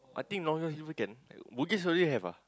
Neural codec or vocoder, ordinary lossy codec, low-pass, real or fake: none; none; none; real